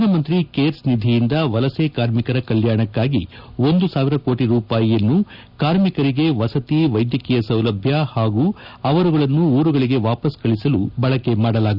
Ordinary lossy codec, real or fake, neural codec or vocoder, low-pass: none; real; none; 5.4 kHz